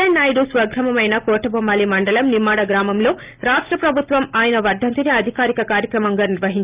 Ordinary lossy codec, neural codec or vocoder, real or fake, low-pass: Opus, 32 kbps; none; real; 3.6 kHz